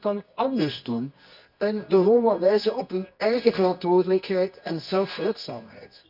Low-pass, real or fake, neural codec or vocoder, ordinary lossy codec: 5.4 kHz; fake; codec, 24 kHz, 0.9 kbps, WavTokenizer, medium music audio release; none